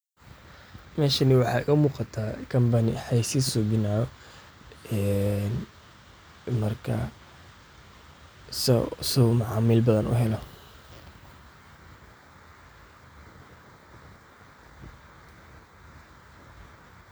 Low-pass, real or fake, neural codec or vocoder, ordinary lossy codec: none; real; none; none